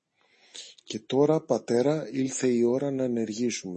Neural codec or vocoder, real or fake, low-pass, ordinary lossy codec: none; real; 10.8 kHz; MP3, 32 kbps